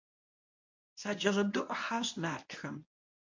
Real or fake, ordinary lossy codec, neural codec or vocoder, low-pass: fake; MP3, 48 kbps; codec, 24 kHz, 0.9 kbps, WavTokenizer, medium speech release version 1; 7.2 kHz